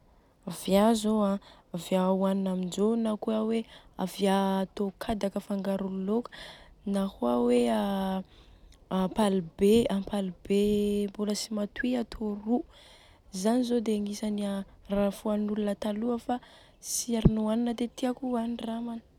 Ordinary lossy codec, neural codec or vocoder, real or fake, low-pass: none; none; real; 19.8 kHz